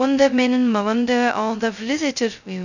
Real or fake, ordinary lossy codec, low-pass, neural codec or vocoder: fake; none; 7.2 kHz; codec, 16 kHz, 0.2 kbps, FocalCodec